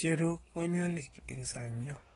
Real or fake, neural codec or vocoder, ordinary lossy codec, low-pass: fake; codec, 24 kHz, 1 kbps, SNAC; AAC, 32 kbps; 10.8 kHz